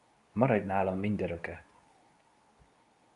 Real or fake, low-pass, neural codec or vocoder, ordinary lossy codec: fake; 10.8 kHz; codec, 24 kHz, 0.9 kbps, WavTokenizer, medium speech release version 2; AAC, 96 kbps